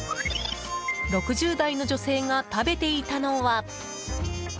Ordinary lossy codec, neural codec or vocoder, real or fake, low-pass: none; none; real; none